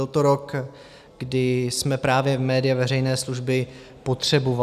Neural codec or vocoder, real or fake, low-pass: none; real; 14.4 kHz